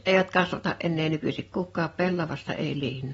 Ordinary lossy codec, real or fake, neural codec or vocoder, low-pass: AAC, 24 kbps; real; none; 19.8 kHz